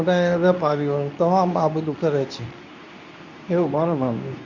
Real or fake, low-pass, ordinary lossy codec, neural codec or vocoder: fake; 7.2 kHz; none; codec, 24 kHz, 0.9 kbps, WavTokenizer, medium speech release version 2